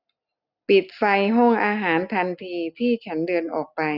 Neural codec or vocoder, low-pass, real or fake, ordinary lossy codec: none; 5.4 kHz; real; none